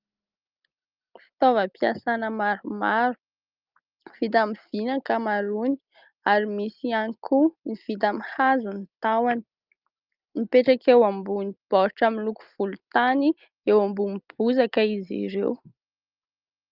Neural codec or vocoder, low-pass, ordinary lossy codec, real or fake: none; 5.4 kHz; Opus, 24 kbps; real